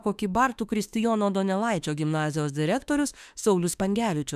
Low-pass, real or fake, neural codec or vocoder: 14.4 kHz; fake; autoencoder, 48 kHz, 32 numbers a frame, DAC-VAE, trained on Japanese speech